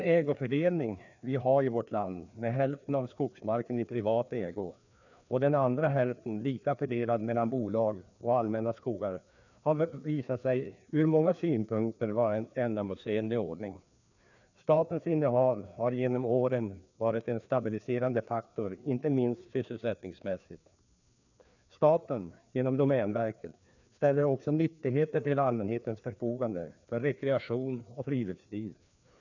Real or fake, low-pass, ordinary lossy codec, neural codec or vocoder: fake; 7.2 kHz; none; codec, 16 kHz, 2 kbps, FreqCodec, larger model